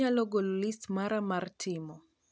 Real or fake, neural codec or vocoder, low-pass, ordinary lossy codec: real; none; none; none